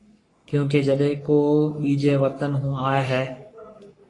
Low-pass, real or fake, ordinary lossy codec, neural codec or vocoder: 10.8 kHz; fake; AAC, 32 kbps; codec, 44.1 kHz, 3.4 kbps, Pupu-Codec